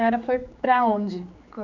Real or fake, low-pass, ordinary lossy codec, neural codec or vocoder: fake; 7.2 kHz; none; codec, 16 kHz, 4 kbps, X-Codec, HuBERT features, trained on general audio